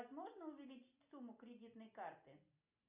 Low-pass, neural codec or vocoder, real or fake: 3.6 kHz; none; real